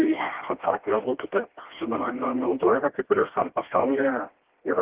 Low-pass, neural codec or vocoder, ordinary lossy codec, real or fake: 3.6 kHz; codec, 16 kHz, 1 kbps, FreqCodec, smaller model; Opus, 16 kbps; fake